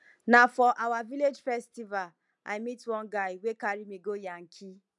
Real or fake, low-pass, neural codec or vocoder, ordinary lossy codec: real; 10.8 kHz; none; none